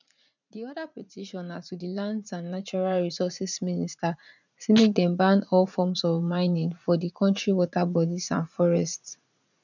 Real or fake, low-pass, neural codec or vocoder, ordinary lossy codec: fake; 7.2 kHz; vocoder, 44.1 kHz, 80 mel bands, Vocos; none